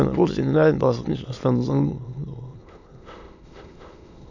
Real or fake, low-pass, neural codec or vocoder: fake; 7.2 kHz; autoencoder, 22.05 kHz, a latent of 192 numbers a frame, VITS, trained on many speakers